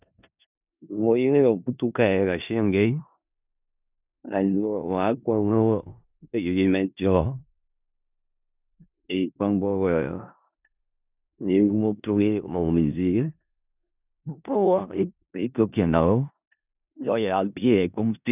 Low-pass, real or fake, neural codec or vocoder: 3.6 kHz; fake; codec, 16 kHz in and 24 kHz out, 0.4 kbps, LongCat-Audio-Codec, four codebook decoder